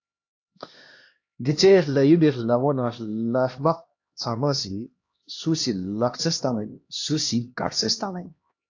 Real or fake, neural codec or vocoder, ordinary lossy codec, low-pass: fake; codec, 16 kHz, 1 kbps, X-Codec, HuBERT features, trained on LibriSpeech; AAC, 48 kbps; 7.2 kHz